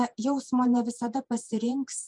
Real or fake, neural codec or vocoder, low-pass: fake; vocoder, 48 kHz, 128 mel bands, Vocos; 10.8 kHz